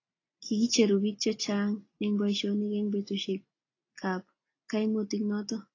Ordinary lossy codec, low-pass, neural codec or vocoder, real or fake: AAC, 32 kbps; 7.2 kHz; none; real